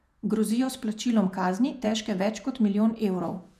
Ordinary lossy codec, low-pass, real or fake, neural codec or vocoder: none; 14.4 kHz; fake; vocoder, 48 kHz, 128 mel bands, Vocos